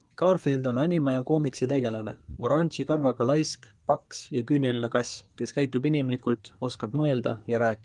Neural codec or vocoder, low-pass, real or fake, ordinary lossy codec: codec, 24 kHz, 1 kbps, SNAC; 10.8 kHz; fake; Opus, 32 kbps